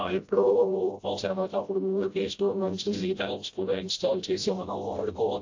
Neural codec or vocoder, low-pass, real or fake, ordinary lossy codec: codec, 16 kHz, 0.5 kbps, FreqCodec, smaller model; 7.2 kHz; fake; AAC, 48 kbps